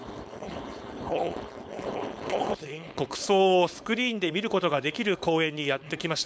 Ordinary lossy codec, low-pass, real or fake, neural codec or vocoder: none; none; fake; codec, 16 kHz, 4.8 kbps, FACodec